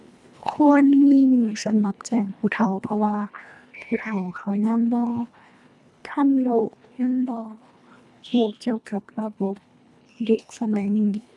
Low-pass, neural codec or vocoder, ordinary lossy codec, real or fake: none; codec, 24 kHz, 1.5 kbps, HILCodec; none; fake